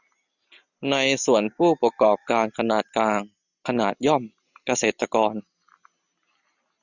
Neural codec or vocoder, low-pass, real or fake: none; 7.2 kHz; real